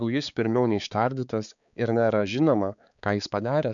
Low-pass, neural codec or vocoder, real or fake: 7.2 kHz; codec, 16 kHz, 4 kbps, X-Codec, HuBERT features, trained on balanced general audio; fake